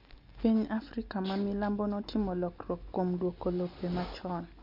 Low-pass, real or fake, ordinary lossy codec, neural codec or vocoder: 5.4 kHz; real; MP3, 48 kbps; none